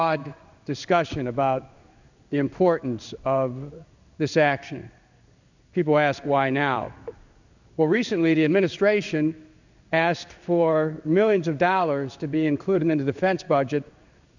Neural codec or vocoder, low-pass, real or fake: codec, 16 kHz in and 24 kHz out, 1 kbps, XY-Tokenizer; 7.2 kHz; fake